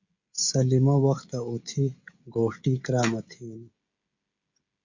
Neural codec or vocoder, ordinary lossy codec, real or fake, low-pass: codec, 16 kHz, 16 kbps, FreqCodec, smaller model; Opus, 64 kbps; fake; 7.2 kHz